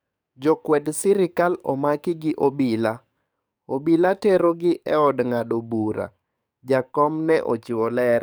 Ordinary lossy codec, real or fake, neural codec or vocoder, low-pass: none; fake; codec, 44.1 kHz, 7.8 kbps, DAC; none